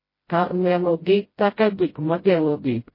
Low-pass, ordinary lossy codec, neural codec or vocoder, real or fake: 5.4 kHz; MP3, 24 kbps; codec, 16 kHz, 0.5 kbps, FreqCodec, smaller model; fake